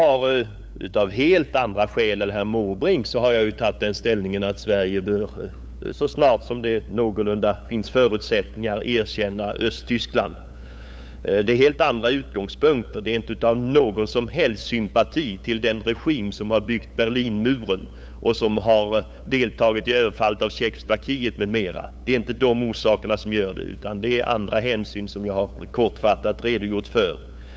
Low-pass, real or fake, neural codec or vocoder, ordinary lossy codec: none; fake; codec, 16 kHz, 8 kbps, FunCodec, trained on LibriTTS, 25 frames a second; none